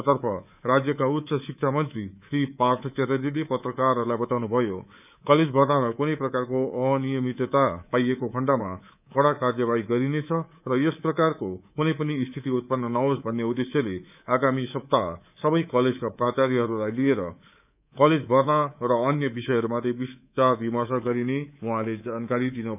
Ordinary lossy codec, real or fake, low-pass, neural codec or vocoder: none; fake; 3.6 kHz; codec, 24 kHz, 3.1 kbps, DualCodec